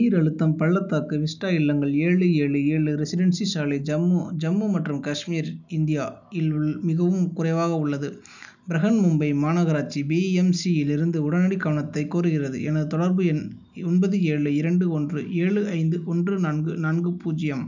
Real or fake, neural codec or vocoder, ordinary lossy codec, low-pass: real; none; none; 7.2 kHz